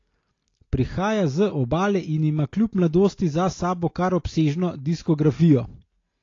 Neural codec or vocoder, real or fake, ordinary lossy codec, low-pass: none; real; AAC, 32 kbps; 7.2 kHz